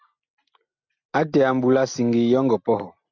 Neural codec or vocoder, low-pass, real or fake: none; 7.2 kHz; real